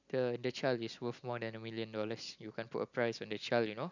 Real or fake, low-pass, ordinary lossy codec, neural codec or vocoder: real; 7.2 kHz; none; none